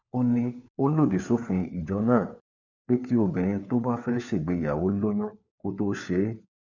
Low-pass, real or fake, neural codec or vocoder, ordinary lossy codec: 7.2 kHz; fake; codec, 16 kHz, 4 kbps, FunCodec, trained on LibriTTS, 50 frames a second; none